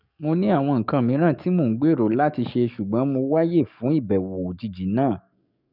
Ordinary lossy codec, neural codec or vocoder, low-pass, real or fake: none; codec, 44.1 kHz, 7.8 kbps, DAC; 5.4 kHz; fake